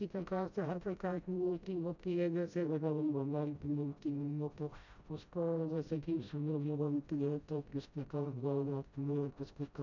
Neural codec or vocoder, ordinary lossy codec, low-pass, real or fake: codec, 16 kHz, 0.5 kbps, FreqCodec, smaller model; none; 7.2 kHz; fake